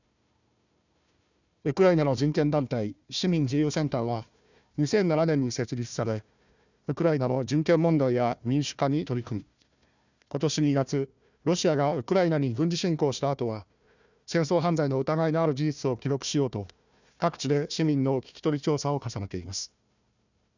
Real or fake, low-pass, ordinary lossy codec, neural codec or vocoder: fake; 7.2 kHz; none; codec, 16 kHz, 1 kbps, FunCodec, trained on Chinese and English, 50 frames a second